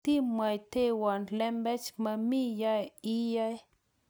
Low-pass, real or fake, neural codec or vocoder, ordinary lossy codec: none; real; none; none